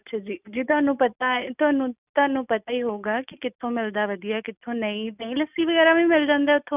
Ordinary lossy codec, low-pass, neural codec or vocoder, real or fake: none; 3.6 kHz; none; real